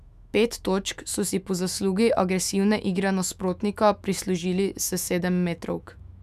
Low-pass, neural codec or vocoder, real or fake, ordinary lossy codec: 14.4 kHz; autoencoder, 48 kHz, 128 numbers a frame, DAC-VAE, trained on Japanese speech; fake; none